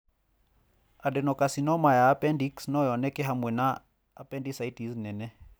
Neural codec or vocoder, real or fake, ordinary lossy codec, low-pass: none; real; none; none